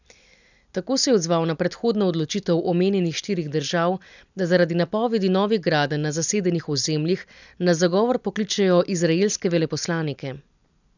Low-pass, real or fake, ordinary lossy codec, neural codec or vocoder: 7.2 kHz; real; none; none